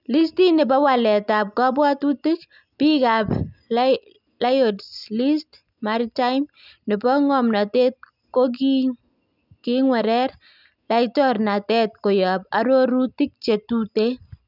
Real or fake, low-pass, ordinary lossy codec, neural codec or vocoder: real; 5.4 kHz; none; none